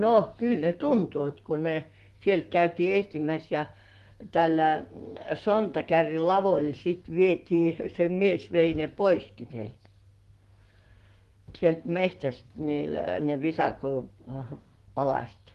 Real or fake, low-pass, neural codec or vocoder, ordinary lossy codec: fake; 14.4 kHz; codec, 32 kHz, 1.9 kbps, SNAC; Opus, 24 kbps